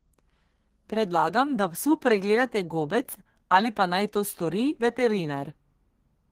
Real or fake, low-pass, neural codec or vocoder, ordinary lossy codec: fake; 14.4 kHz; codec, 32 kHz, 1.9 kbps, SNAC; Opus, 16 kbps